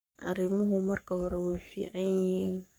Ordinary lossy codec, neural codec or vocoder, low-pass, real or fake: none; codec, 44.1 kHz, 3.4 kbps, Pupu-Codec; none; fake